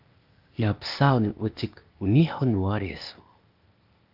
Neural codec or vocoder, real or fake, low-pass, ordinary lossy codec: codec, 16 kHz, 0.8 kbps, ZipCodec; fake; 5.4 kHz; Opus, 24 kbps